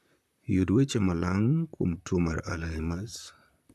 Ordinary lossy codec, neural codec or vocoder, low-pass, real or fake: none; vocoder, 44.1 kHz, 128 mel bands, Pupu-Vocoder; 14.4 kHz; fake